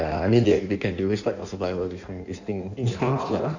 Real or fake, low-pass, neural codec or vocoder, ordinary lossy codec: fake; 7.2 kHz; codec, 16 kHz in and 24 kHz out, 1.1 kbps, FireRedTTS-2 codec; none